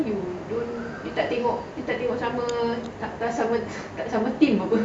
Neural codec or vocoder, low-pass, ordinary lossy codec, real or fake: none; none; none; real